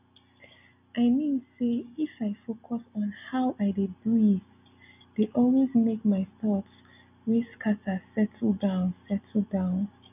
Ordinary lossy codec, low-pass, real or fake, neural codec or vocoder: none; 3.6 kHz; real; none